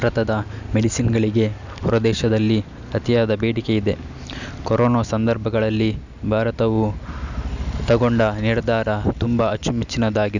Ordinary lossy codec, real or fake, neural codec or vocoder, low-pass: none; real; none; 7.2 kHz